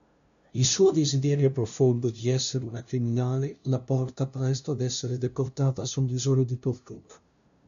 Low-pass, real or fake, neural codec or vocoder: 7.2 kHz; fake; codec, 16 kHz, 0.5 kbps, FunCodec, trained on LibriTTS, 25 frames a second